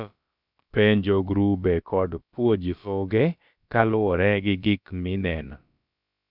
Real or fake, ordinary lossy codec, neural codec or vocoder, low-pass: fake; none; codec, 16 kHz, about 1 kbps, DyCAST, with the encoder's durations; 5.4 kHz